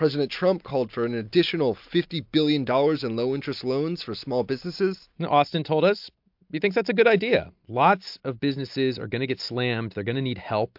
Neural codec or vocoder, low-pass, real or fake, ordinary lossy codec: none; 5.4 kHz; real; MP3, 48 kbps